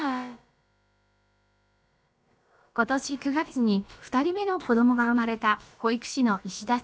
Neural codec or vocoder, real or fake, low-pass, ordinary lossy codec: codec, 16 kHz, about 1 kbps, DyCAST, with the encoder's durations; fake; none; none